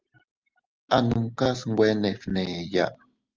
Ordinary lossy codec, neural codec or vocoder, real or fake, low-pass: Opus, 24 kbps; none; real; 7.2 kHz